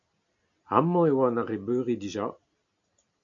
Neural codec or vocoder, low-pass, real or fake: none; 7.2 kHz; real